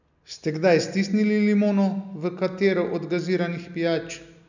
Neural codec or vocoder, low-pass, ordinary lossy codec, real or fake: none; 7.2 kHz; none; real